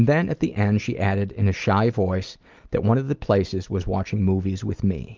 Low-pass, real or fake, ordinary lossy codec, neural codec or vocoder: 7.2 kHz; real; Opus, 32 kbps; none